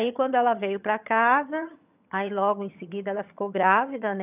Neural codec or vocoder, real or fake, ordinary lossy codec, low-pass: vocoder, 22.05 kHz, 80 mel bands, HiFi-GAN; fake; none; 3.6 kHz